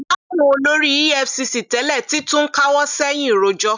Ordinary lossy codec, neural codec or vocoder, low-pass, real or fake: none; none; 7.2 kHz; real